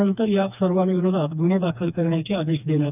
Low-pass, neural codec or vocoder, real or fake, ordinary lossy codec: 3.6 kHz; codec, 16 kHz, 2 kbps, FreqCodec, smaller model; fake; none